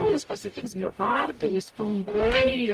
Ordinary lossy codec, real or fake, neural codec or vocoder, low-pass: Opus, 32 kbps; fake; codec, 44.1 kHz, 0.9 kbps, DAC; 14.4 kHz